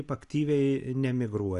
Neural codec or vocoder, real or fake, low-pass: none; real; 10.8 kHz